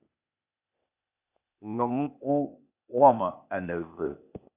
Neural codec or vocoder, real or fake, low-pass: codec, 16 kHz, 0.8 kbps, ZipCodec; fake; 3.6 kHz